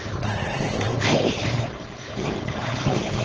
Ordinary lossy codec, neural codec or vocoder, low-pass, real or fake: Opus, 16 kbps; codec, 16 kHz, 4.8 kbps, FACodec; 7.2 kHz; fake